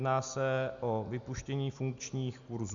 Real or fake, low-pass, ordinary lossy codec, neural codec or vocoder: real; 7.2 kHz; Opus, 64 kbps; none